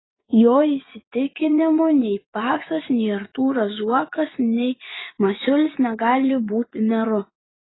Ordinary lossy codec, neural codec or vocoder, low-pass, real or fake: AAC, 16 kbps; vocoder, 24 kHz, 100 mel bands, Vocos; 7.2 kHz; fake